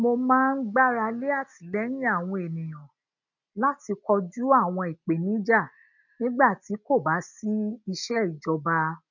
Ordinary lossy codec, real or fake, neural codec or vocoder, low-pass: none; real; none; 7.2 kHz